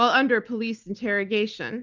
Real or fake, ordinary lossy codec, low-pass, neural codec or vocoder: real; Opus, 32 kbps; 7.2 kHz; none